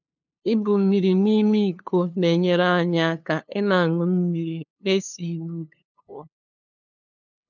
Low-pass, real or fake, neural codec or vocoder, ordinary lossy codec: 7.2 kHz; fake; codec, 16 kHz, 2 kbps, FunCodec, trained on LibriTTS, 25 frames a second; none